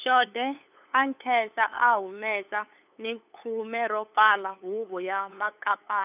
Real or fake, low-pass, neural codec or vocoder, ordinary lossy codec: fake; 3.6 kHz; codec, 16 kHz, 8 kbps, FunCodec, trained on LibriTTS, 25 frames a second; none